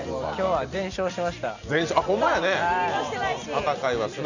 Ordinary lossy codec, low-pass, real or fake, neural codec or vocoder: none; 7.2 kHz; real; none